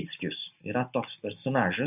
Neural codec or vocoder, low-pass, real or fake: none; 3.6 kHz; real